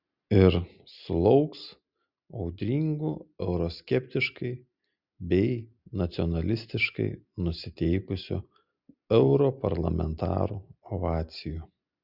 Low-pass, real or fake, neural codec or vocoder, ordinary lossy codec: 5.4 kHz; real; none; Opus, 64 kbps